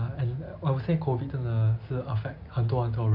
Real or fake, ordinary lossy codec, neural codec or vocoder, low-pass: real; none; none; 5.4 kHz